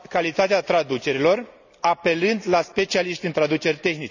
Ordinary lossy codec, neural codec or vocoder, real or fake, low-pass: none; none; real; 7.2 kHz